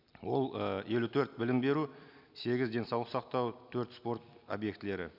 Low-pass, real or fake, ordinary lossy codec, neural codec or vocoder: 5.4 kHz; real; none; none